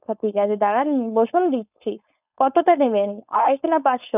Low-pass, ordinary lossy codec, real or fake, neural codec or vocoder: 3.6 kHz; none; fake; codec, 16 kHz, 4.8 kbps, FACodec